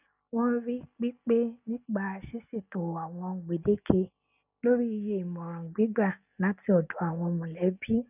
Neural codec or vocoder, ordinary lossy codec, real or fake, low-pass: none; none; real; 3.6 kHz